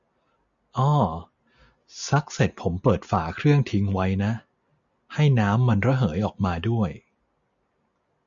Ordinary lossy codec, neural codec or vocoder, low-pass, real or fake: MP3, 64 kbps; none; 7.2 kHz; real